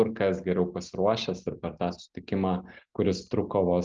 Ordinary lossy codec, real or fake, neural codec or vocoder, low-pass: Opus, 24 kbps; real; none; 7.2 kHz